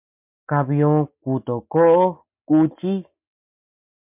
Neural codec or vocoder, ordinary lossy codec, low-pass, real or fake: none; MP3, 32 kbps; 3.6 kHz; real